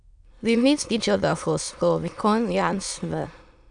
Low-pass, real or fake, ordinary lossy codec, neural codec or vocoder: 9.9 kHz; fake; none; autoencoder, 22.05 kHz, a latent of 192 numbers a frame, VITS, trained on many speakers